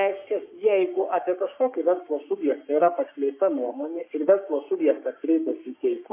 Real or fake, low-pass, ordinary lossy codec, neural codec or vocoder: fake; 3.6 kHz; MP3, 24 kbps; codec, 44.1 kHz, 3.4 kbps, Pupu-Codec